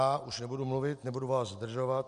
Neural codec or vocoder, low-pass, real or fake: none; 10.8 kHz; real